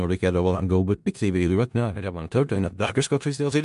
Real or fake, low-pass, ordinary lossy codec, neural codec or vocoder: fake; 10.8 kHz; MP3, 64 kbps; codec, 16 kHz in and 24 kHz out, 0.4 kbps, LongCat-Audio-Codec, four codebook decoder